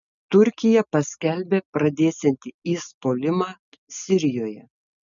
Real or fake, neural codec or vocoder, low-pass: real; none; 7.2 kHz